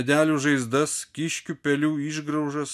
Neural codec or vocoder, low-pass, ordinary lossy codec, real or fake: none; 14.4 kHz; MP3, 96 kbps; real